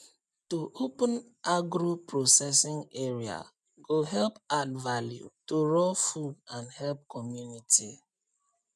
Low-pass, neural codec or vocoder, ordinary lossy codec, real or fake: none; none; none; real